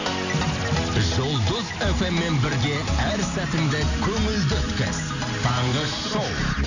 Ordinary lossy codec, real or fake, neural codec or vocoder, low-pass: none; real; none; 7.2 kHz